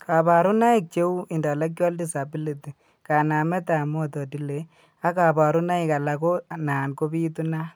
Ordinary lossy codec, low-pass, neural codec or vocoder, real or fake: none; none; none; real